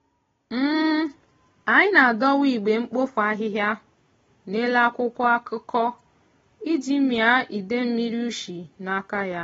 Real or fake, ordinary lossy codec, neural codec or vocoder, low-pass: real; AAC, 24 kbps; none; 7.2 kHz